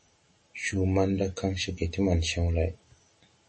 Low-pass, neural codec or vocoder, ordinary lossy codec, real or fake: 10.8 kHz; none; MP3, 32 kbps; real